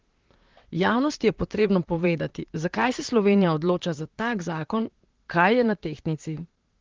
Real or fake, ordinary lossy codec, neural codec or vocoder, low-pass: fake; Opus, 16 kbps; vocoder, 44.1 kHz, 128 mel bands, Pupu-Vocoder; 7.2 kHz